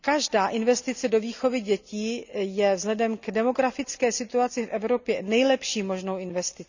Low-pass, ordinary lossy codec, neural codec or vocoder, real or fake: 7.2 kHz; none; none; real